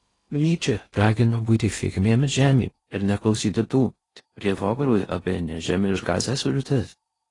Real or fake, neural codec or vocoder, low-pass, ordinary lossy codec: fake; codec, 16 kHz in and 24 kHz out, 0.6 kbps, FocalCodec, streaming, 2048 codes; 10.8 kHz; AAC, 32 kbps